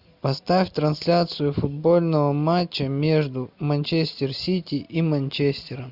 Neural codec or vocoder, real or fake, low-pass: none; real; 5.4 kHz